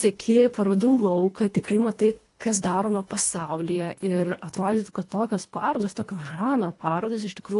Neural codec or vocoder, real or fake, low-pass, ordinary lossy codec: codec, 24 kHz, 1.5 kbps, HILCodec; fake; 10.8 kHz; AAC, 48 kbps